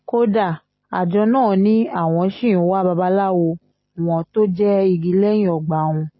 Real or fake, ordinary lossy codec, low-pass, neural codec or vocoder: real; MP3, 24 kbps; 7.2 kHz; none